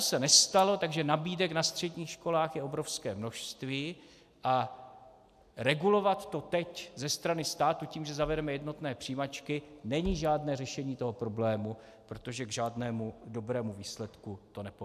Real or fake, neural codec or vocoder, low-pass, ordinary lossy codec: real; none; 14.4 kHz; AAC, 96 kbps